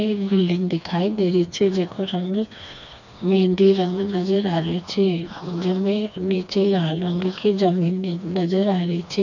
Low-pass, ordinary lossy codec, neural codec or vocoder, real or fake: 7.2 kHz; none; codec, 16 kHz, 2 kbps, FreqCodec, smaller model; fake